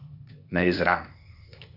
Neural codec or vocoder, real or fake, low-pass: codec, 16 kHz, 0.8 kbps, ZipCodec; fake; 5.4 kHz